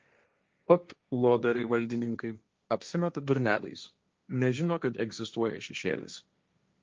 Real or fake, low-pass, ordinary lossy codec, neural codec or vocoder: fake; 7.2 kHz; Opus, 24 kbps; codec, 16 kHz, 1.1 kbps, Voila-Tokenizer